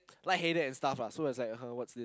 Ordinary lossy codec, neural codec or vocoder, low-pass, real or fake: none; none; none; real